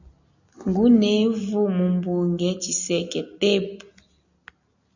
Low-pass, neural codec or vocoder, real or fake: 7.2 kHz; none; real